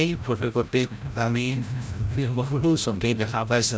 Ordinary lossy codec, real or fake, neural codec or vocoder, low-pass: none; fake; codec, 16 kHz, 0.5 kbps, FreqCodec, larger model; none